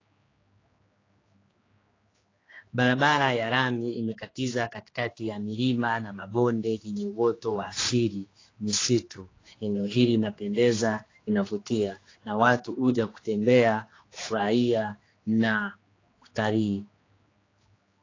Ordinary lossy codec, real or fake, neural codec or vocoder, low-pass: AAC, 32 kbps; fake; codec, 16 kHz, 2 kbps, X-Codec, HuBERT features, trained on general audio; 7.2 kHz